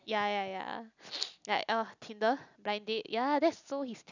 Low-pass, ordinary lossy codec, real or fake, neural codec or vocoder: 7.2 kHz; none; real; none